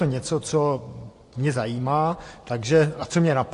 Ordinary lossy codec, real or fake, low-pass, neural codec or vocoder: AAC, 48 kbps; fake; 10.8 kHz; vocoder, 24 kHz, 100 mel bands, Vocos